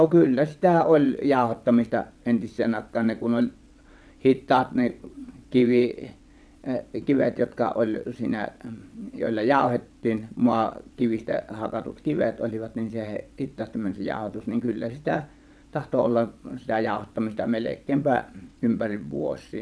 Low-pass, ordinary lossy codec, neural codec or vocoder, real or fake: none; none; vocoder, 22.05 kHz, 80 mel bands, WaveNeXt; fake